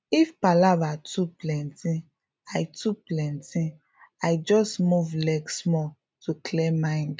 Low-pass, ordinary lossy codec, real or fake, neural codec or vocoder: none; none; real; none